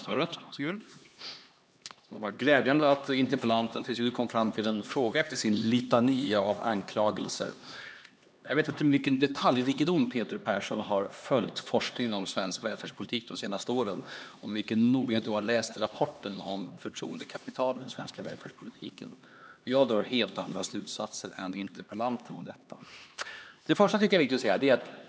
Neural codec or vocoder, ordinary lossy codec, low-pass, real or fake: codec, 16 kHz, 2 kbps, X-Codec, HuBERT features, trained on LibriSpeech; none; none; fake